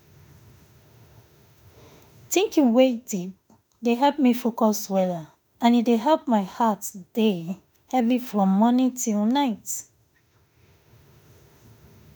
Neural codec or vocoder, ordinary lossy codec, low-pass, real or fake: autoencoder, 48 kHz, 32 numbers a frame, DAC-VAE, trained on Japanese speech; none; none; fake